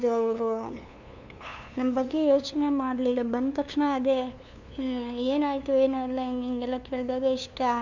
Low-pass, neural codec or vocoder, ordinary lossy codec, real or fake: 7.2 kHz; codec, 16 kHz, 2 kbps, FunCodec, trained on LibriTTS, 25 frames a second; none; fake